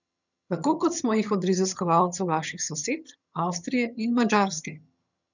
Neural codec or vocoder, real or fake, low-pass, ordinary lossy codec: vocoder, 22.05 kHz, 80 mel bands, HiFi-GAN; fake; 7.2 kHz; none